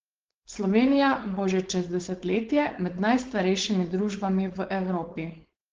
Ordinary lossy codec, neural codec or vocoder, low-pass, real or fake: Opus, 16 kbps; codec, 16 kHz, 4.8 kbps, FACodec; 7.2 kHz; fake